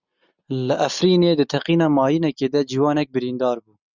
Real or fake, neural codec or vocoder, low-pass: real; none; 7.2 kHz